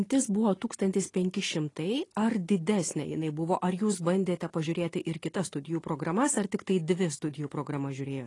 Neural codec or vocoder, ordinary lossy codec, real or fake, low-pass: none; AAC, 32 kbps; real; 10.8 kHz